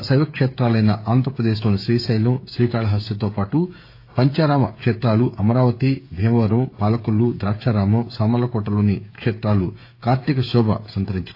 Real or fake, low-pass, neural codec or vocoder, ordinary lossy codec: fake; 5.4 kHz; codec, 16 kHz, 16 kbps, FreqCodec, smaller model; AAC, 32 kbps